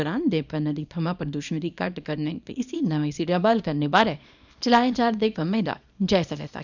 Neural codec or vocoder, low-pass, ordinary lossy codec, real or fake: codec, 24 kHz, 0.9 kbps, WavTokenizer, small release; 7.2 kHz; none; fake